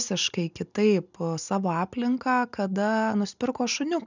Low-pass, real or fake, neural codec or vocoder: 7.2 kHz; real; none